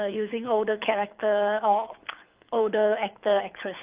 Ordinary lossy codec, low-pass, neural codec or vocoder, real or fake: Opus, 24 kbps; 3.6 kHz; codec, 24 kHz, 6 kbps, HILCodec; fake